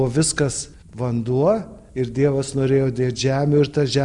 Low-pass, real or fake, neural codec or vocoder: 10.8 kHz; real; none